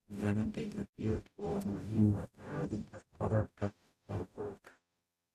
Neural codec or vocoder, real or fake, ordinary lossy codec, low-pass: codec, 44.1 kHz, 0.9 kbps, DAC; fake; none; 14.4 kHz